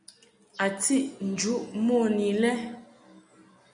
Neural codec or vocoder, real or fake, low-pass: none; real; 9.9 kHz